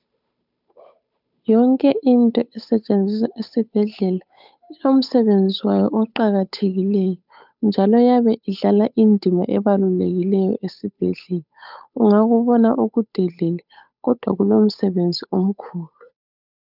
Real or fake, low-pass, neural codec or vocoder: fake; 5.4 kHz; codec, 16 kHz, 8 kbps, FunCodec, trained on Chinese and English, 25 frames a second